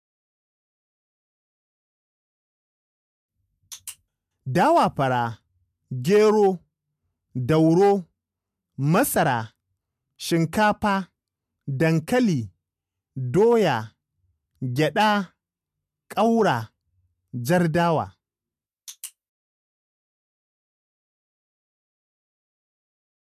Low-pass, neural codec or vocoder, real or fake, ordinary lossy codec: 14.4 kHz; none; real; MP3, 96 kbps